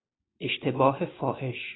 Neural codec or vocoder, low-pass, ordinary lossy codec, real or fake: none; 7.2 kHz; AAC, 16 kbps; real